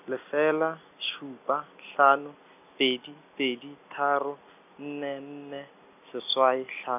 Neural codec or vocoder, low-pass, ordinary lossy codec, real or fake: none; 3.6 kHz; none; real